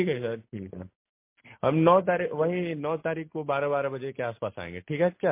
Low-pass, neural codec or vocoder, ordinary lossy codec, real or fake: 3.6 kHz; none; MP3, 24 kbps; real